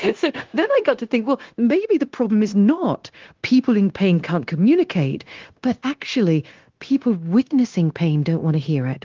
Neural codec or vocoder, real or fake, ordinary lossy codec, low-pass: codec, 16 kHz, 0.9 kbps, LongCat-Audio-Codec; fake; Opus, 16 kbps; 7.2 kHz